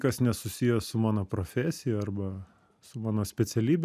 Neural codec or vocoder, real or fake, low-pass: none; real; 14.4 kHz